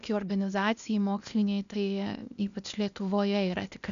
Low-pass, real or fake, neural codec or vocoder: 7.2 kHz; fake; codec, 16 kHz, 0.8 kbps, ZipCodec